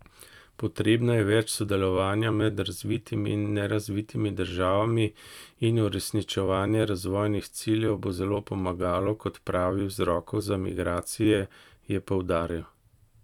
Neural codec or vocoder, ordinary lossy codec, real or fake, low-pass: vocoder, 44.1 kHz, 128 mel bands every 256 samples, BigVGAN v2; none; fake; 19.8 kHz